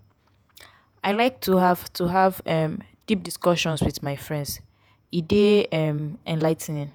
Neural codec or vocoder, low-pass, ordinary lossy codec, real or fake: vocoder, 48 kHz, 128 mel bands, Vocos; none; none; fake